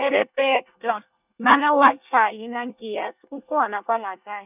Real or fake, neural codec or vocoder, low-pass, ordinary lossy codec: fake; codec, 24 kHz, 1 kbps, SNAC; 3.6 kHz; none